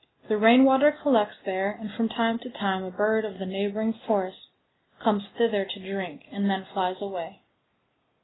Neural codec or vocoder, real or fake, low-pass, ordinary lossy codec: none; real; 7.2 kHz; AAC, 16 kbps